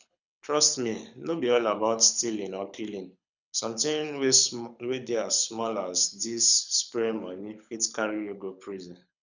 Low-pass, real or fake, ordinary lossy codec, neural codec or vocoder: 7.2 kHz; fake; none; codec, 24 kHz, 6 kbps, HILCodec